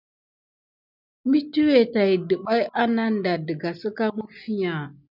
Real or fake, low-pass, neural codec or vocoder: real; 5.4 kHz; none